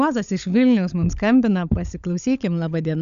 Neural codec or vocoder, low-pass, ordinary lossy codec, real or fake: codec, 16 kHz, 16 kbps, FunCodec, trained on LibriTTS, 50 frames a second; 7.2 kHz; MP3, 96 kbps; fake